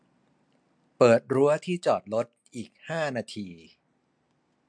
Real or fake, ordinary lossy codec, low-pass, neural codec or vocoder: real; MP3, 64 kbps; 9.9 kHz; none